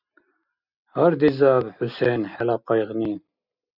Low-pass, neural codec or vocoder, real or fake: 5.4 kHz; none; real